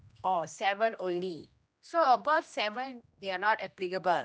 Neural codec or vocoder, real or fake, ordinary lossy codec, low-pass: codec, 16 kHz, 1 kbps, X-Codec, HuBERT features, trained on general audio; fake; none; none